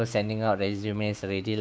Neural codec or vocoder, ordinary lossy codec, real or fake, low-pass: codec, 16 kHz, 6 kbps, DAC; none; fake; none